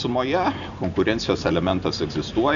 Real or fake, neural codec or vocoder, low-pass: real; none; 7.2 kHz